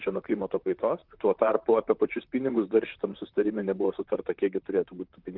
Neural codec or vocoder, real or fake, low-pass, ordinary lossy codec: vocoder, 44.1 kHz, 128 mel bands, Pupu-Vocoder; fake; 5.4 kHz; Opus, 24 kbps